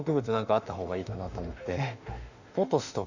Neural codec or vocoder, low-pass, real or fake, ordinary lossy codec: autoencoder, 48 kHz, 32 numbers a frame, DAC-VAE, trained on Japanese speech; 7.2 kHz; fake; none